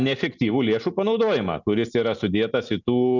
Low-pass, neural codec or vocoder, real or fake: 7.2 kHz; none; real